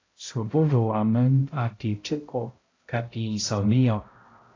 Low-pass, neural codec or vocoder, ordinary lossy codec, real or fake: 7.2 kHz; codec, 16 kHz, 0.5 kbps, X-Codec, HuBERT features, trained on balanced general audio; AAC, 32 kbps; fake